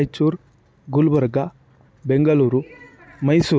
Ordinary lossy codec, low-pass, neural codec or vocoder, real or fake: none; none; none; real